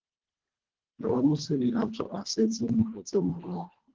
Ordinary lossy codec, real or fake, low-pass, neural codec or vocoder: Opus, 16 kbps; fake; 7.2 kHz; codec, 24 kHz, 1.5 kbps, HILCodec